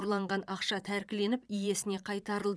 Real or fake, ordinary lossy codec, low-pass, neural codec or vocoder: fake; none; none; vocoder, 22.05 kHz, 80 mel bands, Vocos